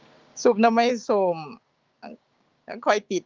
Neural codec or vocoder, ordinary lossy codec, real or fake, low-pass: codec, 16 kHz, 6 kbps, DAC; Opus, 24 kbps; fake; 7.2 kHz